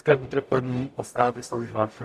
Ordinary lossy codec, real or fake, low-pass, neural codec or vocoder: MP3, 96 kbps; fake; 14.4 kHz; codec, 44.1 kHz, 0.9 kbps, DAC